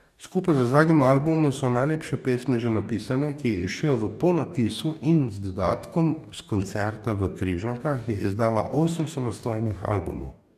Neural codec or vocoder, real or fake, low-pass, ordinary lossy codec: codec, 44.1 kHz, 2.6 kbps, DAC; fake; 14.4 kHz; none